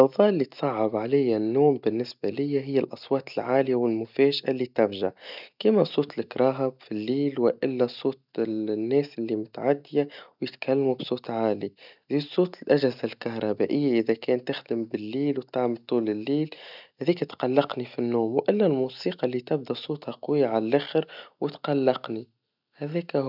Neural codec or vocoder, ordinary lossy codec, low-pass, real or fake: none; none; 5.4 kHz; real